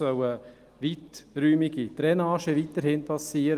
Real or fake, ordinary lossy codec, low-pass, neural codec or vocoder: real; Opus, 24 kbps; 14.4 kHz; none